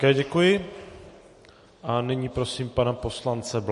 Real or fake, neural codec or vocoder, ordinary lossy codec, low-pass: real; none; MP3, 48 kbps; 14.4 kHz